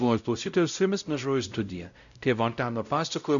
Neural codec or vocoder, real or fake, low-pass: codec, 16 kHz, 0.5 kbps, X-Codec, WavLM features, trained on Multilingual LibriSpeech; fake; 7.2 kHz